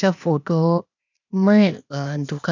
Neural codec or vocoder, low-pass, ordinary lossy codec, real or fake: codec, 16 kHz, 0.8 kbps, ZipCodec; 7.2 kHz; none; fake